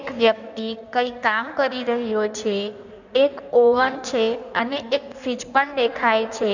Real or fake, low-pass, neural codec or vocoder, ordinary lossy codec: fake; 7.2 kHz; codec, 16 kHz in and 24 kHz out, 1.1 kbps, FireRedTTS-2 codec; none